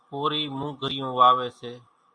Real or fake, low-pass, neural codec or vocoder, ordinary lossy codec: real; 9.9 kHz; none; AAC, 64 kbps